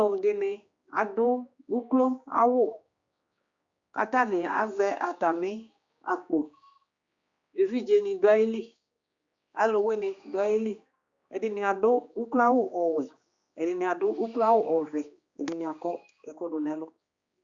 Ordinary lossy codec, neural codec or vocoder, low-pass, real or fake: Opus, 64 kbps; codec, 16 kHz, 2 kbps, X-Codec, HuBERT features, trained on general audio; 7.2 kHz; fake